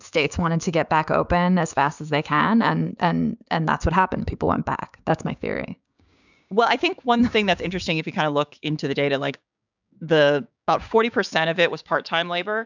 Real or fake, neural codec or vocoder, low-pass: fake; codec, 16 kHz, 6 kbps, DAC; 7.2 kHz